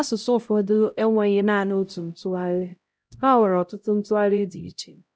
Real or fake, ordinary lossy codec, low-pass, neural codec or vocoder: fake; none; none; codec, 16 kHz, 0.5 kbps, X-Codec, HuBERT features, trained on LibriSpeech